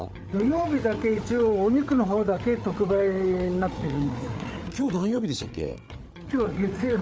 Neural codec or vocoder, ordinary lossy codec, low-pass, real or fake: codec, 16 kHz, 8 kbps, FreqCodec, larger model; none; none; fake